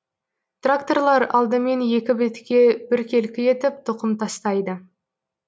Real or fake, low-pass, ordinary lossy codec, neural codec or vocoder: real; none; none; none